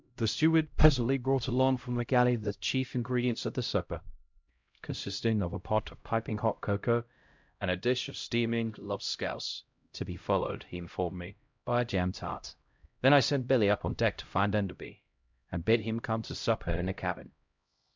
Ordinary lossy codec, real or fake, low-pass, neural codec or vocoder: MP3, 64 kbps; fake; 7.2 kHz; codec, 16 kHz, 0.5 kbps, X-Codec, HuBERT features, trained on LibriSpeech